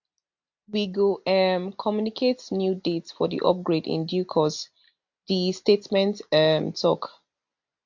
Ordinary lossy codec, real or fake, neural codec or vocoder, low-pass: MP3, 48 kbps; real; none; 7.2 kHz